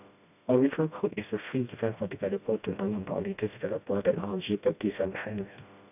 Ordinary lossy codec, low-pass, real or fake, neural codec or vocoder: none; 3.6 kHz; fake; codec, 16 kHz, 1 kbps, FreqCodec, smaller model